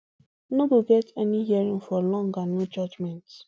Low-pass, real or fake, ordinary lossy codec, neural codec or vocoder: none; real; none; none